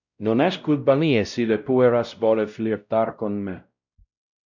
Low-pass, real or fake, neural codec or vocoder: 7.2 kHz; fake; codec, 16 kHz, 0.5 kbps, X-Codec, WavLM features, trained on Multilingual LibriSpeech